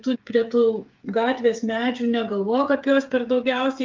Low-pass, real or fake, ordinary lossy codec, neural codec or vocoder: 7.2 kHz; fake; Opus, 24 kbps; codec, 16 kHz, 8 kbps, FreqCodec, smaller model